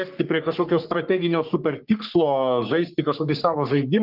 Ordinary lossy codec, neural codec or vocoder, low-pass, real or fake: Opus, 32 kbps; codec, 44.1 kHz, 3.4 kbps, Pupu-Codec; 5.4 kHz; fake